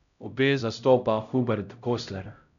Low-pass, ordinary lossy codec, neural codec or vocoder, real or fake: 7.2 kHz; none; codec, 16 kHz, 0.5 kbps, X-Codec, HuBERT features, trained on LibriSpeech; fake